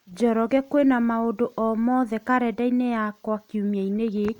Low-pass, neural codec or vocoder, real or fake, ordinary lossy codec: 19.8 kHz; none; real; none